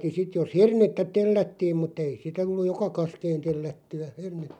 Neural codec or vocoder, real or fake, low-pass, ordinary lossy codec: none; real; 19.8 kHz; none